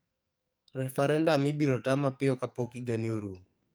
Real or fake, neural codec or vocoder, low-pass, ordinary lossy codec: fake; codec, 44.1 kHz, 2.6 kbps, SNAC; none; none